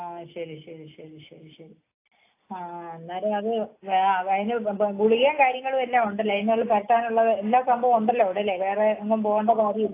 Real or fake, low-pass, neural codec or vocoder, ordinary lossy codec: real; 3.6 kHz; none; AAC, 24 kbps